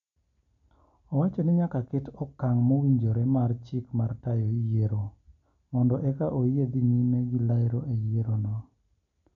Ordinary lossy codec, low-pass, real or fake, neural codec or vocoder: MP3, 64 kbps; 7.2 kHz; real; none